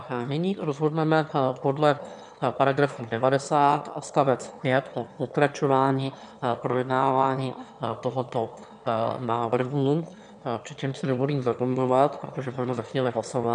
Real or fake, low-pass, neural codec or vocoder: fake; 9.9 kHz; autoencoder, 22.05 kHz, a latent of 192 numbers a frame, VITS, trained on one speaker